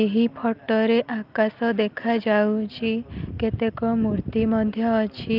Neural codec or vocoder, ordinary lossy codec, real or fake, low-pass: none; Opus, 32 kbps; real; 5.4 kHz